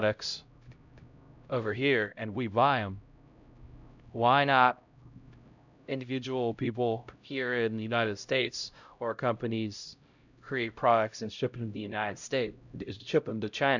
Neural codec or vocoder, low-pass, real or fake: codec, 16 kHz, 0.5 kbps, X-Codec, HuBERT features, trained on LibriSpeech; 7.2 kHz; fake